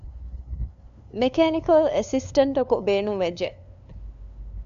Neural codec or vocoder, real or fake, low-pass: codec, 16 kHz, 2 kbps, FunCodec, trained on LibriTTS, 25 frames a second; fake; 7.2 kHz